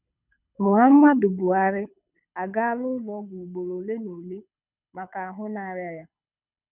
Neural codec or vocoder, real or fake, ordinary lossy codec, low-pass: codec, 24 kHz, 6 kbps, HILCodec; fake; none; 3.6 kHz